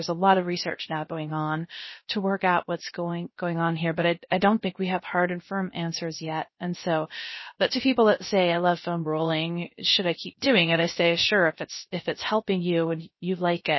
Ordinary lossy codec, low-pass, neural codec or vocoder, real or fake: MP3, 24 kbps; 7.2 kHz; codec, 16 kHz, 0.3 kbps, FocalCodec; fake